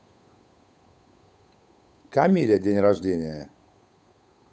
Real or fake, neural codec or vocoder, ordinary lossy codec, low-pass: fake; codec, 16 kHz, 8 kbps, FunCodec, trained on Chinese and English, 25 frames a second; none; none